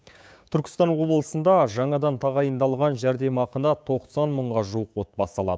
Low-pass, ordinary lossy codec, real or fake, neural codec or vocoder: none; none; fake; codec, 16 kHz, 6 kbps, DAC